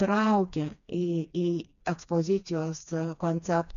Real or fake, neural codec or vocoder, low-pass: fake; codec, 16 kHz, 2 kbps, FreqCodec, smaller model; 7.2 kHz